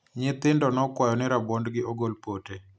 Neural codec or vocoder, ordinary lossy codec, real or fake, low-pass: none; none; real; none